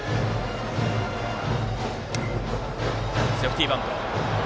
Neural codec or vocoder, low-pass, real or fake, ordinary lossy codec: none; none; real; none